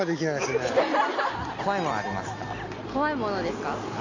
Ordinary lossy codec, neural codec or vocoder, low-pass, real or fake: AAC, 32 kbps; none; 7.2 kHz; real